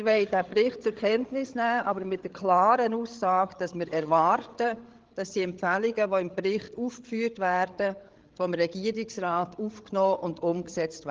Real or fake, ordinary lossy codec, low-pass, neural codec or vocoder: fake; Opus, 16 kbps; 7.2 kHz; codec, 16 kHz, 8 kbps, FreqCodec, larger model